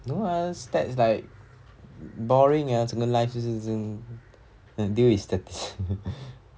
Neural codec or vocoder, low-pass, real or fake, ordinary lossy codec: none; none; real; none